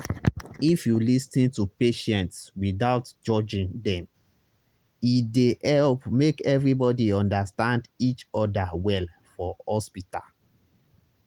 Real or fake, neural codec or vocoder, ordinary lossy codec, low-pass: real; none; Opus, 24 kbps; 19.8 kHz